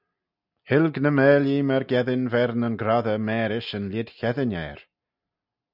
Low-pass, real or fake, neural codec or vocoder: 5.4 kHz; real; none